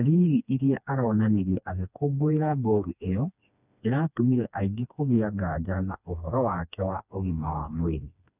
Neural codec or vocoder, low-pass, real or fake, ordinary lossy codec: codec, 16 kHz, 2 kbps, FreqCodec, smaller model; 3.6 kHz; fake; none